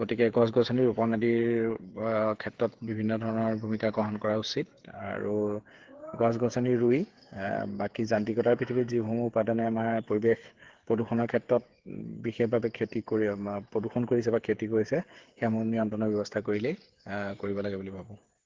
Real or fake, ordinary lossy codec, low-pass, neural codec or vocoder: fake; Opus, 16 kbps; 7.2 kHz; codec, 16 kHz, 8 kbps, FreqCodec, smaller model